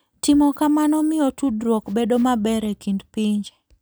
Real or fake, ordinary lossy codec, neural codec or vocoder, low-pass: real; none; none; none